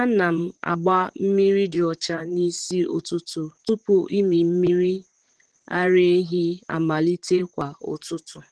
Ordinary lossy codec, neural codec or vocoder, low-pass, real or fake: Opus, 16 kbps; vocoder, 44.1 kHz, 128 mel bands, Pupu-Vocoder; 10.8 kHz; fake